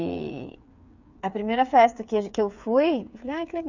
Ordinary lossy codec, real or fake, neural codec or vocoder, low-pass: none; fake; codec, 16 kHz, 8 kbps, FreqCodec, smaller model; 7.2 kHz